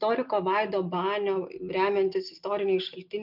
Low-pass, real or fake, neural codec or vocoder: 5.4 kHz; real; none